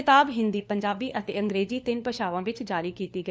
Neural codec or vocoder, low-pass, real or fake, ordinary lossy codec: codec, 16 kHz, 4 kbps, FunCodec, trained on LibriTTS, 50 frames a second; none; fake; none